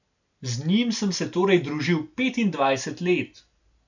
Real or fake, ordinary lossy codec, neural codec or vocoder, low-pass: real; none; none; 7.2 kHz